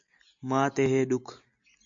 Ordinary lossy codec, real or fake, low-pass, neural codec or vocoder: AAC, 64 kbps; real; 7.2 kHz; none